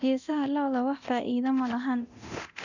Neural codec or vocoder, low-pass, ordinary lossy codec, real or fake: codec, 24 kHz, 0.9 kbps, DualCodec; 7.2 kHz; none; fake